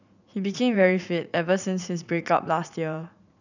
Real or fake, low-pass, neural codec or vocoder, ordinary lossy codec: fake; 7.2 kHz; vocoder, 44.1 kHz, 80 mel bands, Vocos; none